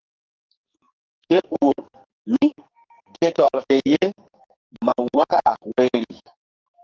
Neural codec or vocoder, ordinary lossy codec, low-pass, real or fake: codec, 44.1 kHz, 2.6 kbps, SNAC; Opus, 32 kbps; 7.2 kHz; fake